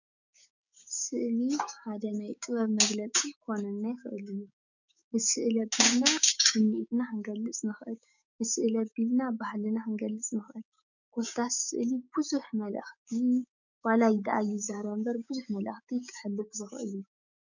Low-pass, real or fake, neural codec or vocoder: 7.2 kHz; fake; codec, 16 kHz, 6 kbps, DAC